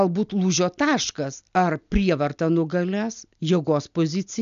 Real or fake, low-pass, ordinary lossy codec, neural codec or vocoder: real; 7.2 kHz; AAC, 96 kbps; none